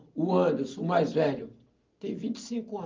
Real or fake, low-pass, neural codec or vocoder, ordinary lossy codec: real; 7.2 kHz; none; Opus, 24 kbps